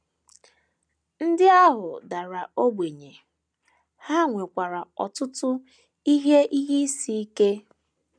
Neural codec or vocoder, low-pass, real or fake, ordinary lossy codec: none; 9.9 kHz; real; none